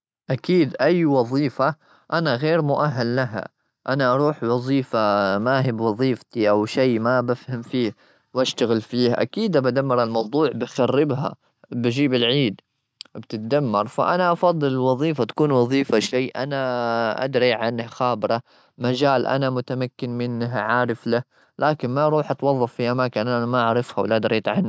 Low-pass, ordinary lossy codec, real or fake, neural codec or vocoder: none; none; real; none